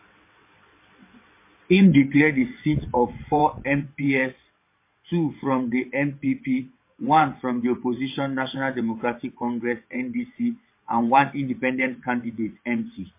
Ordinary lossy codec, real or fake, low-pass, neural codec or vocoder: MP3, 24 kbps; fake; 3.6 kHz; vocoder, 22.05 kHz, 80 mel bands, WaveNeXt